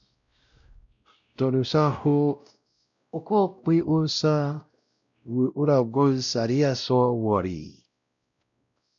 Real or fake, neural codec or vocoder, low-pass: fake; codec, 16 kHz, 0.5 kbps, X-Codec, WavLM features, trained on Multilingual LibriSpeech; 7.2 kHz